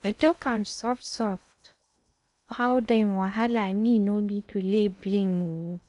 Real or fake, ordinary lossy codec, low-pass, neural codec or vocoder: fake; none; 10.8 kHz; codec, 16 kHz in and 24 kHz out, 0.6 kbps, FocalCodec, streaming, 2048 codes